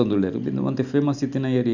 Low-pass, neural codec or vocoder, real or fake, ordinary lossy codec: 7.2 kHz; none; real; none